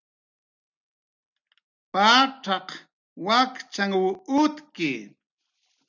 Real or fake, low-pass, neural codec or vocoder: real; 7.2 kHz; none